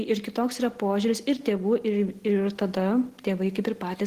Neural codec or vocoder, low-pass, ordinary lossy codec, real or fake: none; 14.4 kHz; Opus, 16 kbps; real